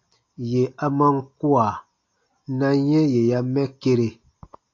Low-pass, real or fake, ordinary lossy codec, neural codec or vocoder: 7.2 kHz; real; AAC, 48 kbps; none